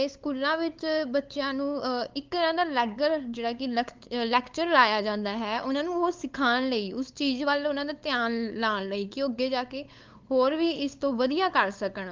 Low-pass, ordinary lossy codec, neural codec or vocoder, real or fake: 7.2 kHz; Opus, 32 kbps; codec, 16 kHz, 8 kbps, FunCodec, trained on LibriTTS, 25 frames a second; fake